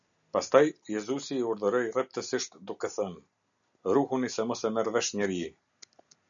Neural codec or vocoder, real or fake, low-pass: none; real; 7.2 kHz